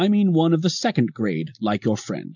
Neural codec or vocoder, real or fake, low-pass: none; real; 7.2 kHz